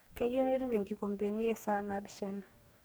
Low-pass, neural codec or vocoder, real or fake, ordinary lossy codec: none; codec, 44.1 kHz, 2.6 kbps, DAC; fake; none